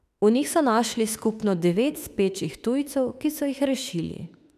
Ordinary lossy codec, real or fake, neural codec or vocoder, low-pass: none; fake; autoencoder, 48 kHz, 32 numbers a frame, DAC-VAE, trained on Japanese speech; 14.4 kHz